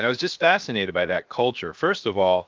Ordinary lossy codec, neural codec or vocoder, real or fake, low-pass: Opus, 32 kbps; codec, 16 kHz, 0.7 kbps, FocalCodec; fake; 7.2 kHz